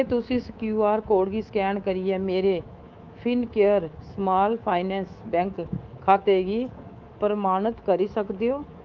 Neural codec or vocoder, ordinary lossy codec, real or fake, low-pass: codec, 24 kHz, 3.1 kbps, DualCodec; Opus, 32 kbps; fake; 7.2 kHz